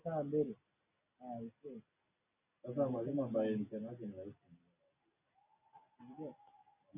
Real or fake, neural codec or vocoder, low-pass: real; none; 3.6 kHz